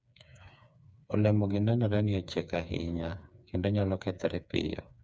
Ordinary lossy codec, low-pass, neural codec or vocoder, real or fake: none; none; codec, 16 kHz, 4 kbps, FreqCodec, smaller model; fake